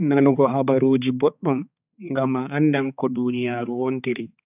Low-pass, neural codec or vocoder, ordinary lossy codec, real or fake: 3.6 kHz; codec, 16 kHz, 4 kbps, X-Codec, HuBERT features, trained on balanced general audio; none; fake